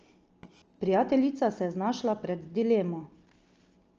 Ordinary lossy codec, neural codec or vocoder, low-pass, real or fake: Opus, 24 kbps; none; 7.2 kHz; real